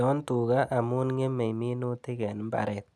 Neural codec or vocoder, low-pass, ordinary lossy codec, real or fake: none; none; none; real